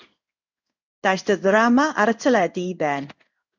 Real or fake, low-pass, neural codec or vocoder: fake; 7.2 kHz; codec, 16 kHz in and 24 kHz out, 1 kbps, XY-Tokenizer